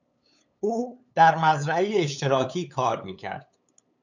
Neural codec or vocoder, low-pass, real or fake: codec, 16 kHz, 8 kbps, FunCodec, trained on LibriTTS, 25 frames a second; 7.2 kHz; fake